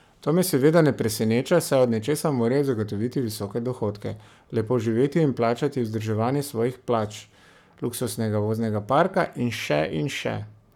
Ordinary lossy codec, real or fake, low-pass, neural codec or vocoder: none; fake; 19.8 kHz; codec, 44.1 kHz, 7.8 kbps, Pupu-Codec